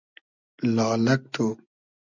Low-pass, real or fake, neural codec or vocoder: 7.2 kHz; real; none